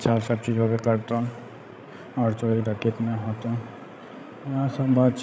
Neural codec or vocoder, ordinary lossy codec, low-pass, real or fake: codec, 16 kHz, 16 kbps, FreqCodec, larger model; none; none; fake